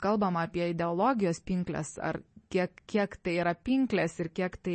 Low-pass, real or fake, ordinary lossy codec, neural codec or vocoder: 10.8 kHz; real; MP3, 32 kbps; none